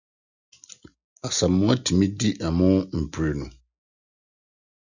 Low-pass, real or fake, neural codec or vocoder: 7.2 kHz; real; none